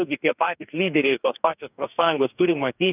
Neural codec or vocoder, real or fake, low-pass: codec, 44.1 kHz, 2.6 kbps, DAC; fake; 3.6 kHz